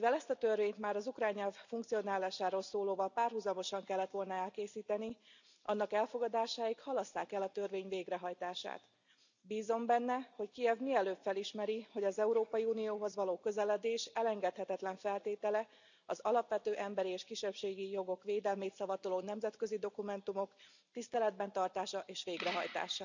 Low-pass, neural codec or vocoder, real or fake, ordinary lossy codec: 7.2 kHz; none; real; none